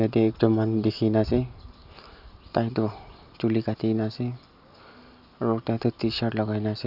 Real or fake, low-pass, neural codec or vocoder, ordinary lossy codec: real; 5.4 kHz; none; none